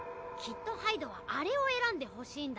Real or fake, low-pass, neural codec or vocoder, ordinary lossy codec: real; none; none; none